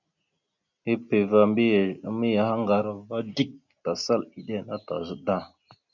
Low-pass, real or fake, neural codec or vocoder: 7.2 kHz; real; none